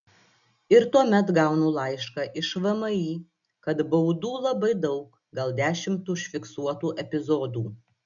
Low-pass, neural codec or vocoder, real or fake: 7.2 kHz; none; real